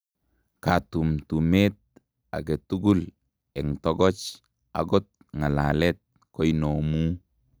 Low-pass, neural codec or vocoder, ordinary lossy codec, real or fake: none; none; none; real